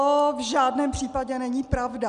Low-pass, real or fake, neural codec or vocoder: 10.8 kHz; real; none